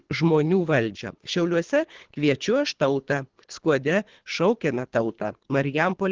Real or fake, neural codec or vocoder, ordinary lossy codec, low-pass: fake; codec, 24 kHz, 3 kbps, HILCodec; Opus, 16 kbps; 7.2 kHz